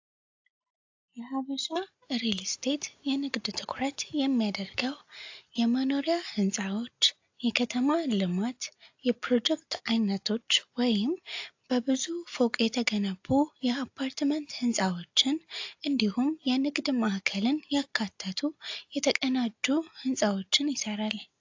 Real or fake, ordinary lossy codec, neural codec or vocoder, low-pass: real; AAC, 48 kbps; none; 7.2 kHz